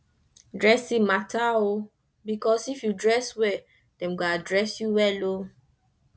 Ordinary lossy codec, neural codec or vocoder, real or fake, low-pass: none; none; real; none